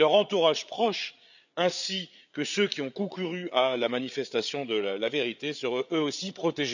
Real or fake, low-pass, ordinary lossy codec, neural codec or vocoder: fake; 7.2 kHz; none; codec, 16 kHz, 8 kbps, FreqCodec, larger model